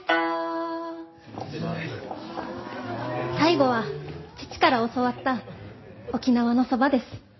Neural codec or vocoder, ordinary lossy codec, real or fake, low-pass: none; MP3, 24 kbps; real; 7.2 kHz